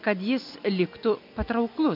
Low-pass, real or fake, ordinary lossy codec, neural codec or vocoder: 5.4 kHz; real; MP3, 48 kbps; none